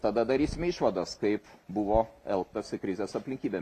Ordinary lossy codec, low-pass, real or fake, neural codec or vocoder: AAC, 64 kbps; 14.4 kHz; real; none